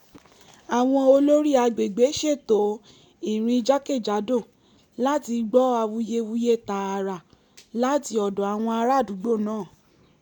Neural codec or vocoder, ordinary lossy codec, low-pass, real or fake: none; none; 19.8 kHz; real